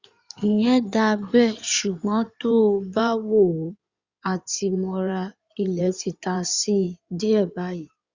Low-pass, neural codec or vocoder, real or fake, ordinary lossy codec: 7.2 kHz; codec, 16 kHz in and 24 kHz out, 2.2 kbps, FireRedTTS-2 codec; fake; Opus, 64 kbps